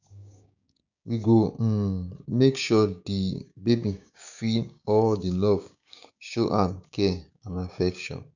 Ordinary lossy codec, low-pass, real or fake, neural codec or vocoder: none; 7.2 kHz; fake; codec, 16 kHz, 6 kbps, DAC